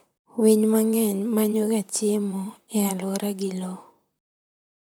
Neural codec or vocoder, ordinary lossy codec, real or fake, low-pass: vocoder, 44.1 kHz, 128 mel bands, Pupu-Vocoder; none; fake; none